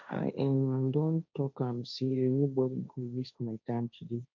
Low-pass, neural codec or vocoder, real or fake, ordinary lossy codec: 7.2 kHz; codec, 16 kHz, 1.1 kbps, Voila-Tokenizer; fake; none